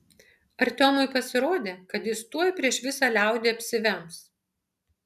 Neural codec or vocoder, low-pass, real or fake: none; 14.4 kHz; real